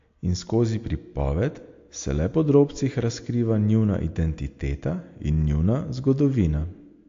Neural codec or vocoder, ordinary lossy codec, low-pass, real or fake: none; AAC, 48 kbps; 7.2 kHz; real